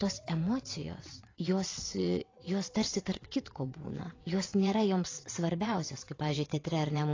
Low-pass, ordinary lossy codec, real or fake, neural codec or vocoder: 7.2 kHz; AAC, 32 kbps; fake; vocoder, 22.05 kHz, 80 mel bands, WaveNeXt